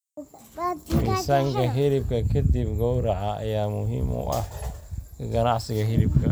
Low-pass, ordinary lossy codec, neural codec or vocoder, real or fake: none; none; none; real